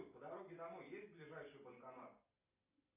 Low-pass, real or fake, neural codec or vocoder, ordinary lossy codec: 3.6 kHz; real; none; AAC, 16 kbps